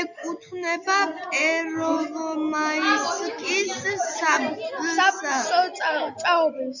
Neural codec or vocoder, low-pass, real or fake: none; 7.2 kHz; real